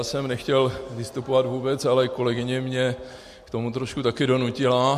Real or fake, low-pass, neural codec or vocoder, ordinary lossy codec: real; 14.4 kHz; none; MP3, 64 kbps